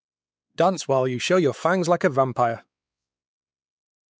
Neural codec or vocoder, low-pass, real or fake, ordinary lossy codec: codec, 16 kHz, 4 kbps, X-Codec, WavLM features, trained on Multilingual LibriSpeech; none; fake; none